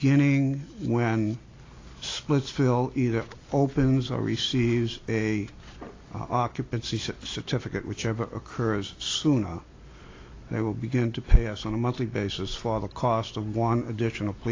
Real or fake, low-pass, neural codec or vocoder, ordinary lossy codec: real; 7.2 kHz; none; AAC, 32 kbps